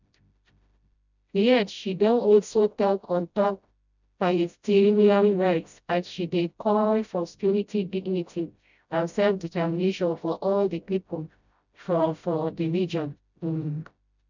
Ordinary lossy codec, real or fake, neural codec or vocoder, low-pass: none; fake; codec, 16 kHz, 0.5 kbps, FreqCodec, smaller model; 7.2 kHz